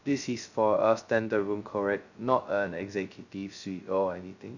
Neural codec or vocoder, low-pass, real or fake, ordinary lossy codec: codec, 16 kHz, 0.2 kbps, FocalCodec; 7.2 kHz; fake; none